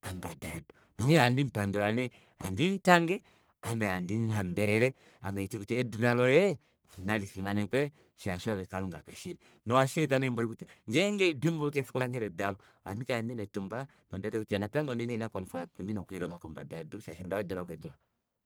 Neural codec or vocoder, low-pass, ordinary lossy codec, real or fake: codec, 44.1 kHz, 1.7 kbps, Pupu-Codec; none; none; fake